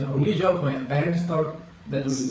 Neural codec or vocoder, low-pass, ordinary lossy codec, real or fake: codec, 16 kHz, 4 kbps, FreqCodec, larger model; none; none; fake